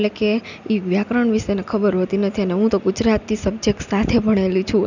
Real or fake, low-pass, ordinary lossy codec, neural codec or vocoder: real; 7.2 kHz; none; none